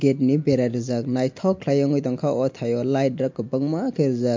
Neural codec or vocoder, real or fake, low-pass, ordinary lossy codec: none; real; 7.2 kHz; MP3, 48 kbps